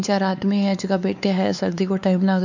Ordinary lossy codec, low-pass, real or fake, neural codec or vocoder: none; 7.2 kHz; fake; codec, 16 kHz, 4 kbps, X-Codec, WavLM features, trained on Multilingual LibriSpeech